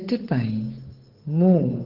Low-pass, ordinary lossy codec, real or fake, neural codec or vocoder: 5.4 kHz; Opus, 16 kbps; fake; codec, 16 kHz, 8 kbps, FunCodec, trained on Chinese and English, 25 frames a second